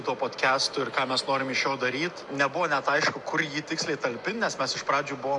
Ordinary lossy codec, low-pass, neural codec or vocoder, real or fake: MP3, 96 kbps; 10.8 kHz; none; real